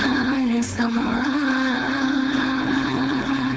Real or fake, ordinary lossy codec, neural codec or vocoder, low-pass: fake; none; codec, 16 kHz, 4.8 kbps, FACodec; none